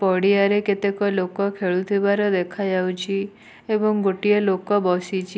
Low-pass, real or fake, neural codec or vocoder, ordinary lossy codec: none; real; none; none